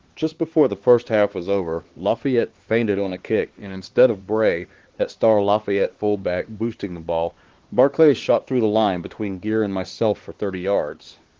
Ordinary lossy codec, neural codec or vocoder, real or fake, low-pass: Opus, 16 kbps; codec, 16 kHz, 2 kbps, X-Codec, HuBERT features, trained on LibriSpeech; fake; 7.2 kHz